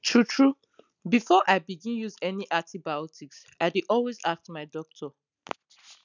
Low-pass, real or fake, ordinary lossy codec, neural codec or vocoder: 7.2 kHz; real; none; none